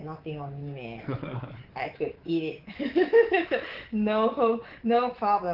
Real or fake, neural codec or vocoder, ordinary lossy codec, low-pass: fake; codec, 24 kHz, 3.1 kbps, DualCodec; Opus, 16 kbps; 5.4 kHz